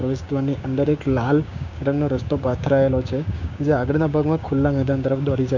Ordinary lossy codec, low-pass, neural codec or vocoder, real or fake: none; 7.2 kHz; codec, 16 kHz, 6 kbps, DAC; fake